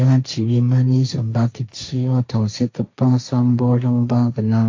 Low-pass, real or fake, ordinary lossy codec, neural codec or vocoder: 7.2 kHz; fake; AAC, 48 kbps; codec, 16 kHz, 1.1 kbps, Voila-Tokenizer